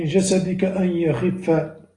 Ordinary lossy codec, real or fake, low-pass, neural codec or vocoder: AAC, 32 kbps; real; 10.8 kHz; none